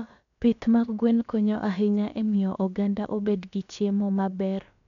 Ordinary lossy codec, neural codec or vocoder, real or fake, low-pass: none; codec, 16 kHz, about 1 kbps, DyCAST, with the encoder's durations; fake; 7.2 kHz